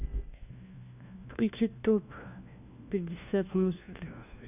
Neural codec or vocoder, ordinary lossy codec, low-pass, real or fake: codec, 16 kHz, 1 kbps, FunCodec, trained on LibriTTS, 50 frames a second; none; 3.6 kHz; fake